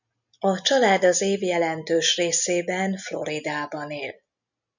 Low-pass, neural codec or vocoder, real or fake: 7.2 kHz; none; real